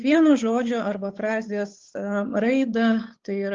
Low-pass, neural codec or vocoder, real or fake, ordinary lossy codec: 7.2 kHz; codec, 16 kHz, 8 kbps, FunCodec, trained on Chinese and English, 25 frames a second; fake; Opus, 32 kbps